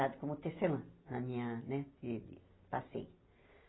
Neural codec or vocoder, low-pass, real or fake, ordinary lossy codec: none; 7.2 kHz; real; AAC, 16 kbps